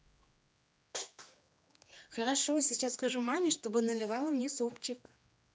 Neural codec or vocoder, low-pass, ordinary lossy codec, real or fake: codec, 16 kHz, 2 kbps, X-Codec, HuBERT features, trained on general audio; none; none; fake